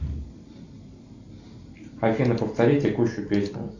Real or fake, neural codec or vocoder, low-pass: real; none; 7.2 kHz